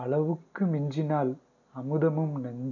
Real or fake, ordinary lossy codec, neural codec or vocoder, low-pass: real; none; none; 7.2 kHz